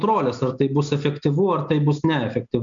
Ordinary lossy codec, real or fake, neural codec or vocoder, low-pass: MP3, 64 kbps; real; none; 7.2 kHz